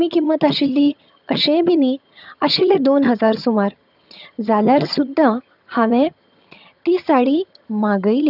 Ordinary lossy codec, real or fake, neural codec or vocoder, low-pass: none; fake; vocoder, 22.05 kHz, 80 mel bands, HiFi-GAN; 5.4 kHz